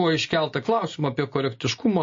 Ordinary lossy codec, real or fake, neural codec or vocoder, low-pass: MP3, 32 kbps; real; none; 7.2 kHz